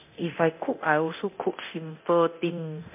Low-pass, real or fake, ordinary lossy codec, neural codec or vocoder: 3.6 kHz; fake; MP3, 32 kbps; codec, 24 kHz, 0.9 kbps, DualCodec